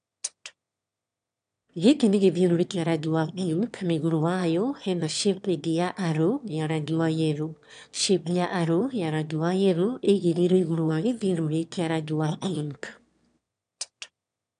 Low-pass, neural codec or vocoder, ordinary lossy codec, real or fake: 9.9 kHz; autoencoder, 22.05 kHz, a latent of 192 numbers a frame, VITS, trained on one speaker; MP3, 96 kbps; fake